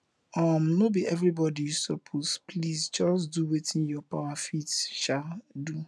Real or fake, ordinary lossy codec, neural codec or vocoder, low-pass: real; none; none; none